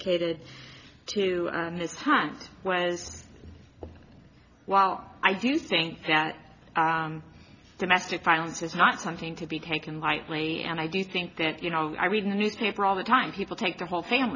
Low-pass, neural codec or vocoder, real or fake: 7.2 kHz; none; real